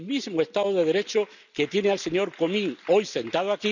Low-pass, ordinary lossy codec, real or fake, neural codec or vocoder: 7.2 kHz; none; real; none